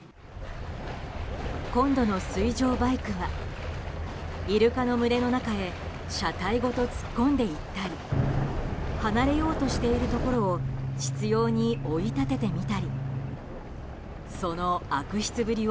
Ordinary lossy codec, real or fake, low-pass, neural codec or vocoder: none; real; none; none